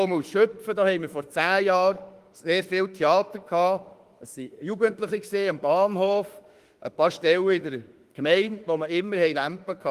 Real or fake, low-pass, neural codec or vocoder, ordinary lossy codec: fake; 14.4 kHz; autoencoder, 48 kHz, 32 numbers a frame, DAC-VAE, trained on Japanese speech; Opus, 24 kbps